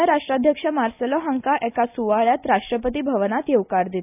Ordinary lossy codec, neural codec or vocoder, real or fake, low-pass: none; none; real; 3.6 kHz